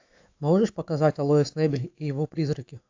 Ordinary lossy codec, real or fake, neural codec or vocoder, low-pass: AAC, 48 kbps; fake; autoencoder, 48 kHz, 128 numbers a frame, DAC-VAE, trained on Japanese speech; 7.2 kHz